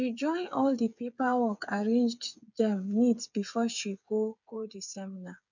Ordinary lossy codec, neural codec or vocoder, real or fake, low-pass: none; codec, 16 kHz, 8 kbps, FreqCodec, smaller model; fake; 7.2 kHz